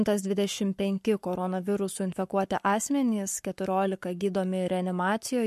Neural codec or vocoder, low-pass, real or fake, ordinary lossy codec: codec, 44.1 kHz, 7.8 kbps, Pupu-Codec; 14.4 kHz; fake; MP3, 64 kbps